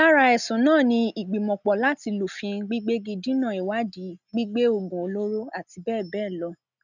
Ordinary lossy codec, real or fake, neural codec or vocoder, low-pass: none; real; none; 7.2 kHz